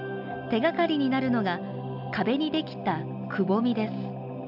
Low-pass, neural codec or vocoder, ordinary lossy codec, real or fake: 5.4 kHz; none; AAC, 48 kbps; real